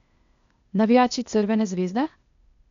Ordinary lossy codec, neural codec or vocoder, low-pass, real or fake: none; codec, 16 kHz, 0.8 kbps, ZipCodec; 7.2 kHz; fake